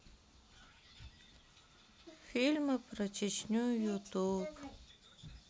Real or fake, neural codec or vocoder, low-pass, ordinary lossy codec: real; none; none; none